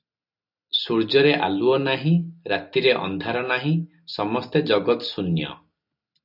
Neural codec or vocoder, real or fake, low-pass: none; real; 5.4 kHz